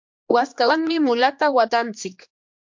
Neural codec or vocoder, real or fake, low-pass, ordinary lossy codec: codec, 16 kHz, 2 kbps, X-Codec, HuBERT features, trained on general audio; fake; 7.2 kHz; MP3, 48 kbps